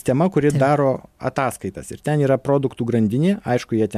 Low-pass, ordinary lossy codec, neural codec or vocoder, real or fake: 14.4 kHz; MP3, 96 kbps; none; real